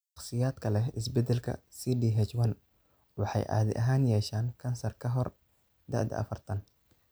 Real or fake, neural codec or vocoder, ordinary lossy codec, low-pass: real; none; none; none